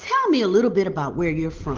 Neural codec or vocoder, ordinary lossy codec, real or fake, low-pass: none; Opus, 16 kbps; real; 7.2 kHz